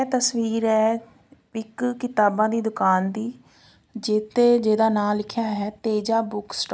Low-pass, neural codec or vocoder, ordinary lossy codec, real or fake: none; none; none; real